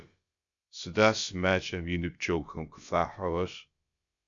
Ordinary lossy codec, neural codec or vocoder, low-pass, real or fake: Opus, 64 kbps; codec, 16 kHz, about 1 kbps, DyCAST, with the encoder's durations; 7.2 kHz; fake